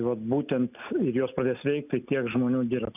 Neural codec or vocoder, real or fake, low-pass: none; real; 3.6 kHz